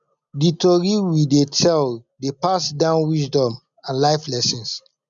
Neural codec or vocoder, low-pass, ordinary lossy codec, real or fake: none; 7.2 kHz; none; real